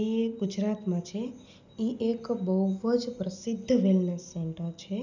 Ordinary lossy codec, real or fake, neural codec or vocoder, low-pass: none; real; none; 7.2 kHz